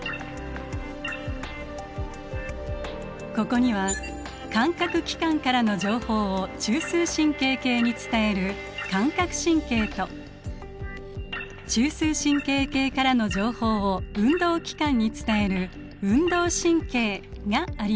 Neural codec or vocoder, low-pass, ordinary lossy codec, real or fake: none; none; none; real